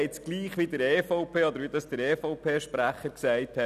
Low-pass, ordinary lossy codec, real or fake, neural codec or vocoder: 14.4 kHz; none; real; none